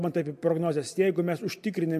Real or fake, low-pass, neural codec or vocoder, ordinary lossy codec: real; 14.4 kHz; none; MP3, 64 kbps